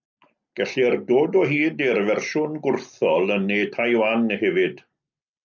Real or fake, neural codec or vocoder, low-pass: real; none; 7.2 kHz